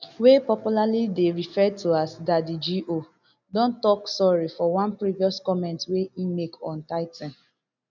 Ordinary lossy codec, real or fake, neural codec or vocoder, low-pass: none; real; none; 7.2 kHz